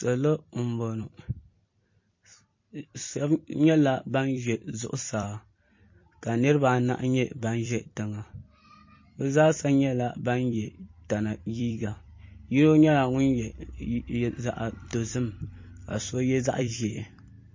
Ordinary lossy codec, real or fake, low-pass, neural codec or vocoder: MP3, 32 kbps; fake; 7.2 kHz; vocoder, 44.1 kHz, 128 mel bands every 256 samples, BigVGAN v2